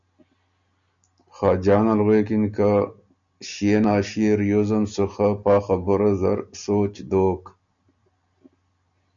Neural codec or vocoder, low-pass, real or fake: none; 7.2 kHz; real